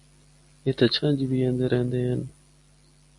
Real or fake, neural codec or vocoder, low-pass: real; none; 10.8 kHz